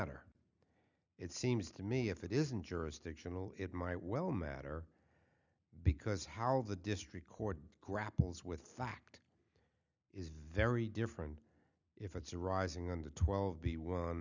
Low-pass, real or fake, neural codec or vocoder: 7.2 kHz; real; none